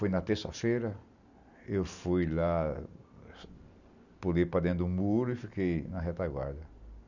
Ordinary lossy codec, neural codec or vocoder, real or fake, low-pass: none; none; real; 7.2 kHz